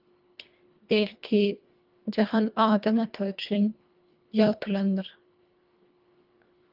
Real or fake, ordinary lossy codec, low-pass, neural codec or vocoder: fake; Opus, 24 kbps; 5.4 kHz; codec, 24 kHz, 1.5 kbps, HILCodec